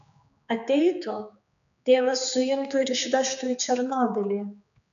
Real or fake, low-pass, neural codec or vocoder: fake; 7.2 kHz; codec, 16 kHz, 4 kbps, X-Codec, HuBERT features, trained on general audio